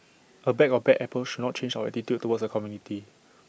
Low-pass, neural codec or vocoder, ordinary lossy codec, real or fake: none; none; none; real